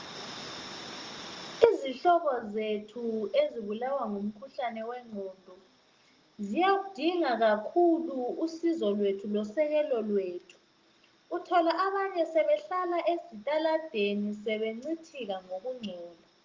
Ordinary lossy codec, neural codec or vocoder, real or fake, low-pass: Opus, 32 kbps; none; real; 7.2 kHz